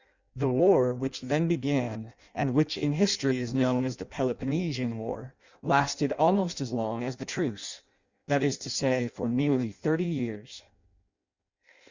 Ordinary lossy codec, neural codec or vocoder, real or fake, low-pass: Opus, 64 kbps; codec, 16 kHz in and 24 kHz out, 0.6 kbps, FireRedTTS-2 codec; fake; 7.2 kHz